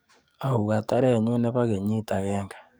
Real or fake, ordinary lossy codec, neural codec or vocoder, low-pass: fake; none; codec, 44.1 kHz, 7.8 kbps, DAC; none